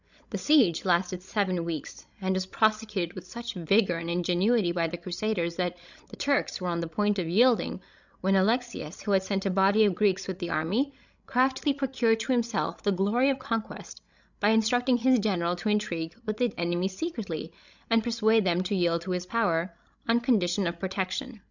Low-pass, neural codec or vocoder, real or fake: 7.2 kHz; codec, 16 kHz, 16 kbps, FreqCodec, larger model; fake